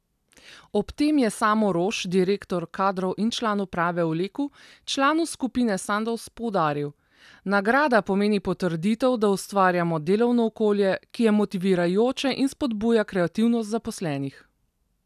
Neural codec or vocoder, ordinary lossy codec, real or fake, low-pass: none; none; real; 14.4 kHz